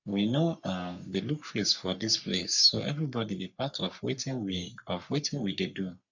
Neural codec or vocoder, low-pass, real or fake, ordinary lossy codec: codec, 44.1 kHz, 3.4 kbps, Pupu-Codec; 7.2 kHz; fake; none